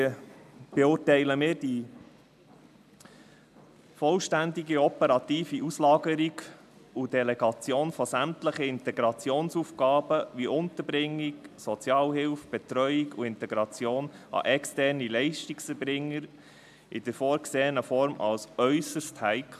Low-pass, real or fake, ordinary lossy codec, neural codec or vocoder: 14.4 kHz; real; MP3, 96 kbps; none